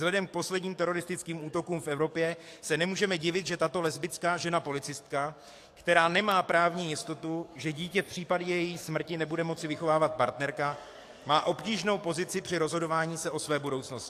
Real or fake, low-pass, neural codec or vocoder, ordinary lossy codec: fake; 14.4 kHz; codec, 44.1 kHz, 7.8 kbps, DAC; AAC, 64 kbps